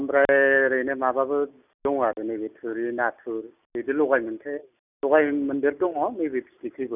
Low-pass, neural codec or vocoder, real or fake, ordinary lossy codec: 3.6 kHz; none; real; none